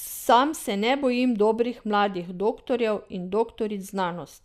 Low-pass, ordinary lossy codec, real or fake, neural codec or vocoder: 14.4 kHz; none; real; none